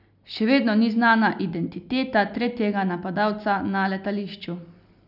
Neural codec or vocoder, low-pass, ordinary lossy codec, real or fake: none; 5.4 kHz; none; real